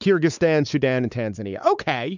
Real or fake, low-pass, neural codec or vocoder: fake; 7.2 kHz; codec, 16 kHz, 4 kbps, X-Codec, WavLM features, trained on Multilingual LibriSpeech